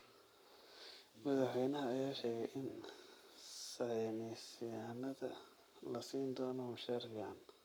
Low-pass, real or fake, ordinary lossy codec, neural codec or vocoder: none; fake; none; codec, 44.1 kHz, 7.8 kbps, Pupu-Codec